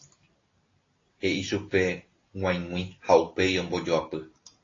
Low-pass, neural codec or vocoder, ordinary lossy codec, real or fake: 7.2 kHz; none; AAC, 32 kbps; real